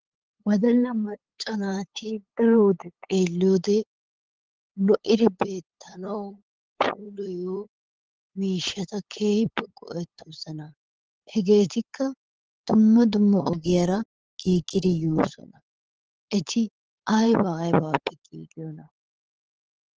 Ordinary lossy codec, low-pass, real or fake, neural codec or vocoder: Opus, 16 kbps; 7.2 kHz; fake; codec, 16 kHz, 8 kbps, FunCodec, trained on LibriTTS, 25 frames a second